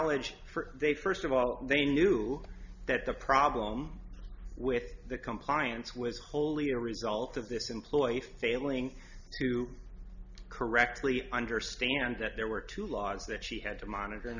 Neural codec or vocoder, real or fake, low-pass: none; real; 7.2 kHz